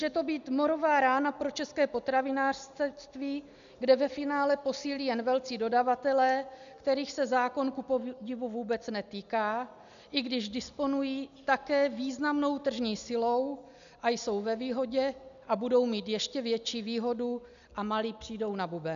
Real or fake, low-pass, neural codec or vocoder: real; 7.2 kHz; none